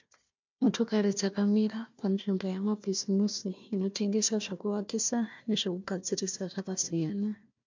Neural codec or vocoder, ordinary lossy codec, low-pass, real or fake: codec, 16 kHz, 1 kbps, FunCodec, trained on Chinese and English, 50 frames a second; MP3, 64 kbps; 7.2 kHz; fake